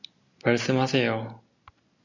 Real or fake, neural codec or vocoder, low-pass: real; none; 7.2 kHz